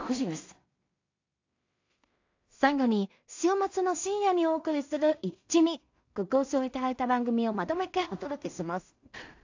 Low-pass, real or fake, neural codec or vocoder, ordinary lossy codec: 7.2 kHz; fake; codec, 16 kHz in and 24 kHz out, 0.4 kbps, LongCat-Audio-Codec, two codebook decoder; AAC, 48 kbps